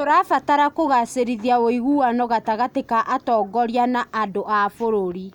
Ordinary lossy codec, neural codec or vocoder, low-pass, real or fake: none; none; 19.8 kHz; real